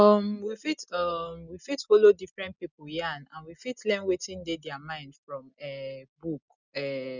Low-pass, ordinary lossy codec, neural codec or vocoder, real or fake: 7.2 kHz; none; none; real